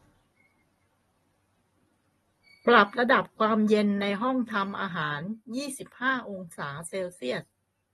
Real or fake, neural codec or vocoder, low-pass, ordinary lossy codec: real; none; 19.8 kHz; AAC, 32 kbps